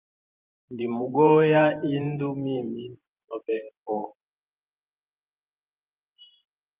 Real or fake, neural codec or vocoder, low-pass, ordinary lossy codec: fake; vocoder, 44.1 kHz, 128 mel bands every 512 samples, BigVGAN v2; 3.6 kHz; Opus, 24 kbps